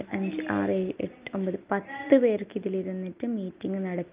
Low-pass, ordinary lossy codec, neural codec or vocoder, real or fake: 3.6 kHz; Opus, 24 kbps; none; real